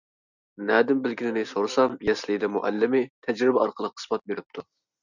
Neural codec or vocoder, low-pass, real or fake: none; 7.2 kHz; real